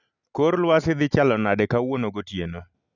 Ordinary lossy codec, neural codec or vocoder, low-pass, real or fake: none; none; 7.2 kHz; real